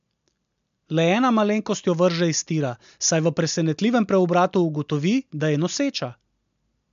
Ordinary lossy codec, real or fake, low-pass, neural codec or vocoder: MP3, 64 kbps; real; 7.2 kHz; none